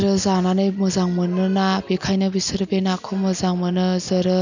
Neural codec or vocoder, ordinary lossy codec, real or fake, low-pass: none; none; real; 7.2 kHz